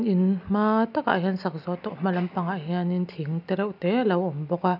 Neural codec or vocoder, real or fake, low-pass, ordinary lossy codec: none; real; 5.4 kHz; none